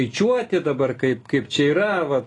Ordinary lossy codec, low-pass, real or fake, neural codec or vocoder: AAC, 32 kbps; 10.8 kHz; real; none